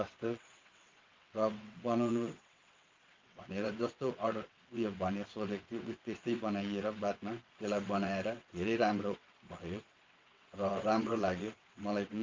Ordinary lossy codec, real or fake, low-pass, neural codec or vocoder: Opus, 32 kbps; fake; 7.2 kHz; vocoder, 22.05 kHz, 80 mel bands, WaveNeXt